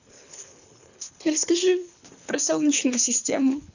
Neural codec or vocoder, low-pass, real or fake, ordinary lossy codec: codec, 24 kHz, 3 kbps, HILCodec; 7.2 kHz; fake; none